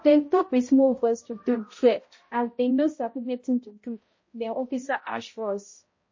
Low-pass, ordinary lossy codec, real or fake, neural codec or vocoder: 7.2 kHz; MP3, 32 kbps; fake; codec, 16 kHz, 0.5 kbps, X-Codec, HuBERT features, trained on balanced general audio